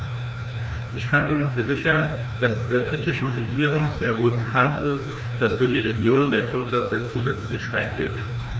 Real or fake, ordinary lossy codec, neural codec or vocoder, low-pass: fake; none; codec, 16 kHz, 1 kbps, FreqCodec, larger model; none